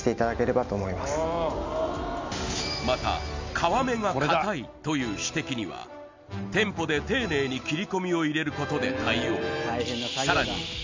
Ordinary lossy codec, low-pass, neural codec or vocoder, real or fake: MP3, 64 kbps; 7.2 kHz; none; real